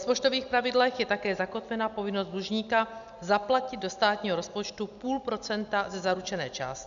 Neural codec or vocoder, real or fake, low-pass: none; real; 7.2 kHz